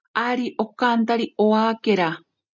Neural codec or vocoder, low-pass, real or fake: none; 7.2 kHz; real